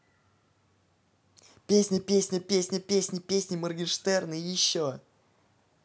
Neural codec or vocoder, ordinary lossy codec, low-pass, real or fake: none; none; none; real